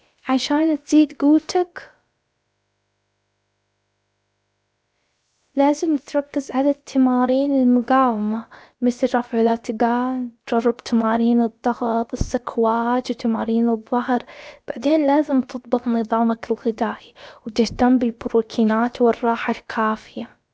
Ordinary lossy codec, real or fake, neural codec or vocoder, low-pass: none; fake; codec, 16 kHz, about 1 kbps, DyCAST, with the encoder's durations; none